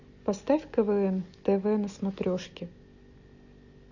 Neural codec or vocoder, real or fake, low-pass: none; real; 7.2 kHz